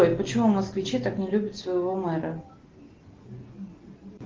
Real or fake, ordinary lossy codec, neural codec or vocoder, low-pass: real; Opus, 16 kbps; none; 7.2 kHz